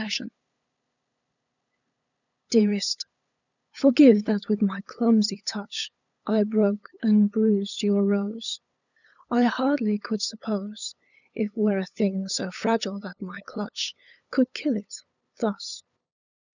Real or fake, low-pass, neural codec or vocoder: fake; 7.2 kHz; codec, 16 kHz, 8 kbps, FunCodec, trained on LibriTTS, 25 frames a second